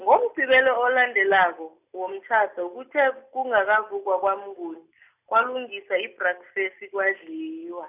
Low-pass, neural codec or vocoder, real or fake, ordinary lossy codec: 3.6 kHz; none; real; none